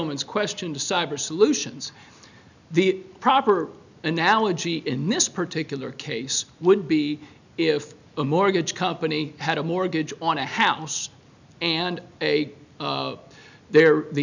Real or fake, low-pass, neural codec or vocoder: real; 7.2 kHz; none